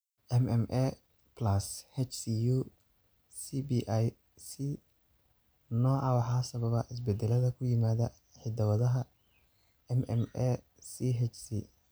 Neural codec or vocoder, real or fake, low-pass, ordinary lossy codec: none; real; none; none